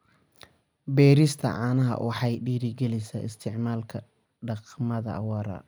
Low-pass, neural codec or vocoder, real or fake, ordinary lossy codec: none; none; real; none